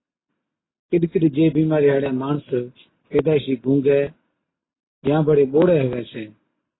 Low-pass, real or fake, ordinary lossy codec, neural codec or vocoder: 7.2 kHz; real; AAC, 16 kbps; none